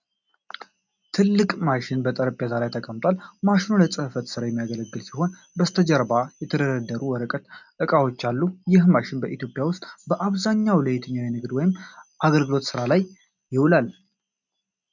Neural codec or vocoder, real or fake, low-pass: none; real; 7.2 kHz